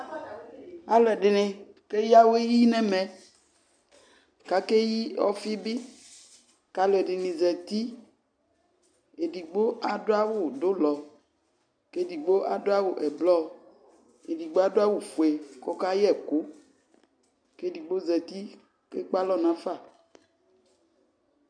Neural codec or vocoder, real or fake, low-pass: none; real; 9.9 kHz